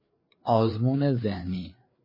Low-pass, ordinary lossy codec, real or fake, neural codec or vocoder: 5.4 kHz; MP3, 24 kbps; fake; codec, 16 kHz, 4 kbps, FreqCodec, larger model